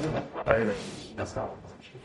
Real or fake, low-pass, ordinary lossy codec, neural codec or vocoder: fake; 19.8 kHz; MP3, 48 kbps; codec, 44.1 kHz, 0.9 kbps, DAC